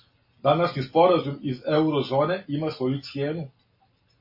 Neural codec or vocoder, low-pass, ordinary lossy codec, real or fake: none; 5.4 kHz; MP3, 24 kbps; real